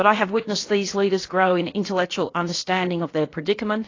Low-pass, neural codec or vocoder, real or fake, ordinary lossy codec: 7.2 kHz; codec, 16 kHz, about 1 kbps, DyCAST, with the encoder's durations; fake; AAC, 32 kbps